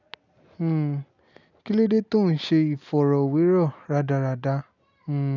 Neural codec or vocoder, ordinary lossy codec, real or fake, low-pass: none; none; real; 7.2 kHz